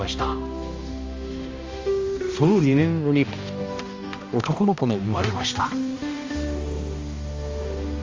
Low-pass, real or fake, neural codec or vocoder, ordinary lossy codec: 7.2 kHz; fake; codec, 16 kHz, 1 kbps, X-Codec, HuBERT features, trained on balanced general audio; Opus, 32 kbps